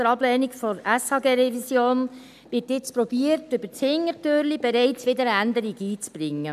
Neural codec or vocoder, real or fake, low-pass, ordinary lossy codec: none; real; 14.4 kHz; none